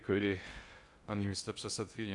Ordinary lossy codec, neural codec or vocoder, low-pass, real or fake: Opus, 64 kbps; codec, 16 kHz in and 24 kHz out, 0.6 kbps, FocalCodec, streaming, 2048 codes; 10.8 kHz; fake